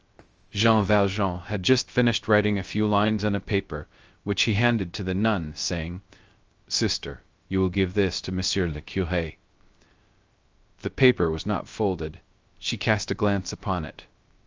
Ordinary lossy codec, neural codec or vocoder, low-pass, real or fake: Opus, 24 kbps; codec, 16 kHz, 0.3 kbps, FocalCodec; 7.2 kHz; fake